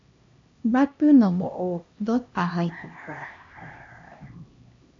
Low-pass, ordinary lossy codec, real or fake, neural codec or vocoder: 7.2 kHz; AAC, 48 kbps; fake; codec, 16 kHz, 1 kbps, X-Codec, HuBERT features, trained on LibriSpeech